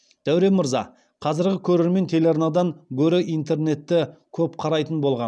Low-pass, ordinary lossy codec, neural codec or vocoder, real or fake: none; none; none; real